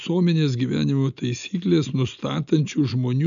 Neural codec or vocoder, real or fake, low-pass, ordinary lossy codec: none; real; 7.2 kHz; MP3, 96 kbps